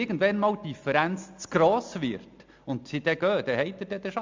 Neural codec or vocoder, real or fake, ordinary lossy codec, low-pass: none; real; MP3, 64 kbps; 7.2 kHz